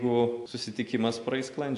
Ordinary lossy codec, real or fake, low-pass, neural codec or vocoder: AAC, 64 kbps; real; 10.8 kHz; none